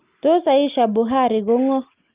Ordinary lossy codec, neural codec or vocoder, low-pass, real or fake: Opus, 32 kbps; none; 3.6 kHz; real